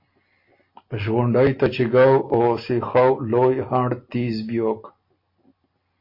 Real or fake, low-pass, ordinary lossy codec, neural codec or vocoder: real; 5.4 kHz; MP3, 32 kbps; none